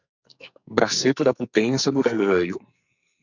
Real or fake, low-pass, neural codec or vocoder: fake; 7.2 kHz; codec, 44.1 kHz, 2.6 kbps, SNAC